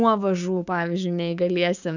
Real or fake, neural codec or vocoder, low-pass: fake; codec, 16 kHz, 6 kbps, DAC; 7.2 kHz